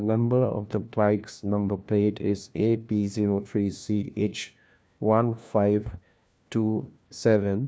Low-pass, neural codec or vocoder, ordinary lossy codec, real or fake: none; codec, 16 kHz, 1 kbps, FunCodec, trained on LibriTTS, 50 frames a second; none; fake